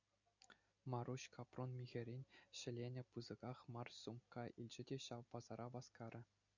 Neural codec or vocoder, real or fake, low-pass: none; real; 7.2 kHz